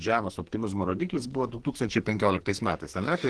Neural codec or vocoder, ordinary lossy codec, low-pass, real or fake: codec, 44.1 kHz, 2.6 kbps, SNAC; Opus, 16 kbps; 10.8 kHz; fake